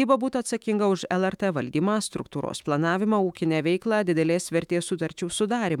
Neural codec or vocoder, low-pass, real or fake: autoencoder, 48 kHz, 128 numbers a frame, DAC-VAE, trained on Japanese speech; 19.8 kHz; fake